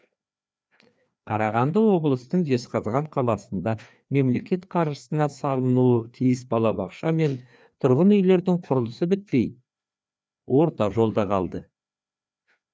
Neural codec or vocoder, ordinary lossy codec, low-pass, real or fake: codec, 16 kHz, 2 kbps, FreqCodec, larger model; none; none; fake